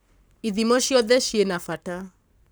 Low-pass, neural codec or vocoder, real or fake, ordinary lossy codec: none; codec, 44.1 kHz, 7.8 kbps, Pupu-Codec; fake; none